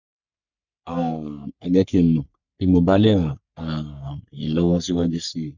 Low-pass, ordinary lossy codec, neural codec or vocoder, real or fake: 7.2 kHz; none; codec, 44.1 kHz, 3.4 kbps, Pupu-Codec; fake